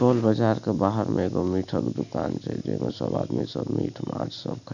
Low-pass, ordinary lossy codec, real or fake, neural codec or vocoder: 7.2 kHz; none; real; none